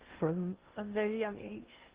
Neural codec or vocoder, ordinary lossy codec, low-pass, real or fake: codec, 16 kHz in and 24 kHz out, 0.6 kbps, FocalCodec, streaming, 2048 codes; Opus, 16 kbps; 3.6 kHz; fake